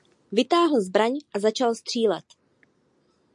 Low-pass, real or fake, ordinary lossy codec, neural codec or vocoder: 10.8 kHz; real; MP3, 64 kbps; none